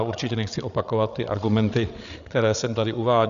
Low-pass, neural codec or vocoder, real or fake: 7.2 kHz; codec, 16 kHz, 8 kbps, FreqCodec, larger model; fake